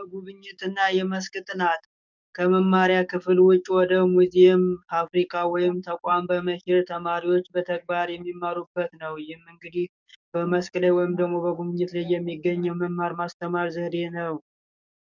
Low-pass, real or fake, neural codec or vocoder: 7.2 kHz; fake; codec, 44.1 kHz, 7.8 kbps, DAC